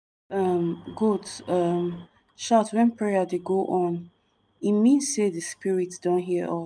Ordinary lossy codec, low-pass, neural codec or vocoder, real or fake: none; none; none; real